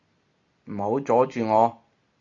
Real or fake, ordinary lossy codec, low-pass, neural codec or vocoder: real; MP3, 64 kbps; 7.2 kHz; none